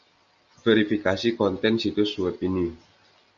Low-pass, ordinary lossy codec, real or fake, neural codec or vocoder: 7.2 kHz; Opus, 64 kbps; real; none